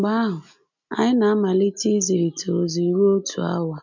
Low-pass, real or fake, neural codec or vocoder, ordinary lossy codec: 7.2 kHz; real; none; none